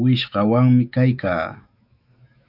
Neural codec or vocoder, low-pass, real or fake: autoencoder, 48 kHz, 128 numbers a frame, DAC-VAE, trained on Japanese speech; 5.4 kHz; fake